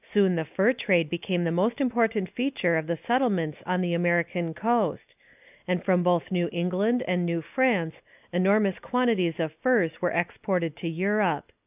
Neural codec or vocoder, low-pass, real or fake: none; 3.6 kHz; real